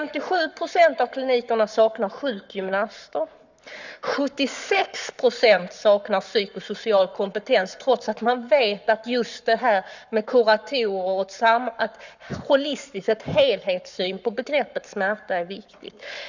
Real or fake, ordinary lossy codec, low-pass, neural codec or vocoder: fake; none; 7.2 kHz; codec, 44.1 kHz, 7.8 kbps, Pupu-Codec